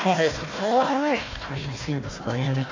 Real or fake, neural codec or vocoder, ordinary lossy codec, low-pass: fake; codec, 16 kHz, 1 kbps, FunCodec, trained on Chinese and English, 50 frames a second; AAC, 32 kbps; 7.2 kHz